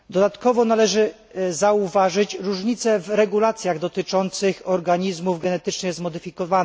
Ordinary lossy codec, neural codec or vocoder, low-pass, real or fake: none; none; none; real